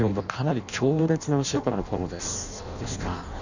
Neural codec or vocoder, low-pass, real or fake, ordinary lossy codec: codec, 16 kHz in and 24 kHz out, 0.6 kbps, FireRedTTS-2 codec; 7.2 kHz; fake; none